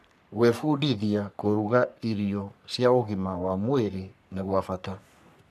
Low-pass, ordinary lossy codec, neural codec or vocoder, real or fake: 14.4 kHz; none; codec, 44.1 kHz, 3.4 kbps, Pupu-Codec; fake